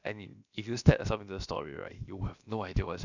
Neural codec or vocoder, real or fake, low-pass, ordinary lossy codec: codec, 16 kHz, about 1 kbps, DyCAST, with the encoder's durations; fake; 7.2 kHz; none